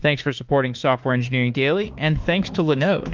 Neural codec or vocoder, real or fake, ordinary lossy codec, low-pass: autoencoder, 48 kHz, 32 numbers a frame, DAC-VAE, trained on Japanese speech; fake; Opus, 32 kbps; 7.2 kHz